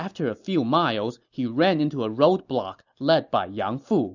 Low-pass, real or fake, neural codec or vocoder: 7.2 kHz; real; none